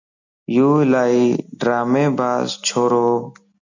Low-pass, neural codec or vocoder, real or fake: 7.2 kHz; none; real